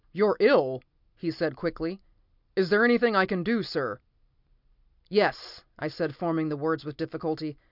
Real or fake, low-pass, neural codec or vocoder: real; 5.4 kHz; none